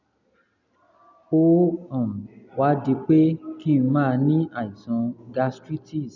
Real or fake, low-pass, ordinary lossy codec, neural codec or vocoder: real; 7.2 kHz; none; none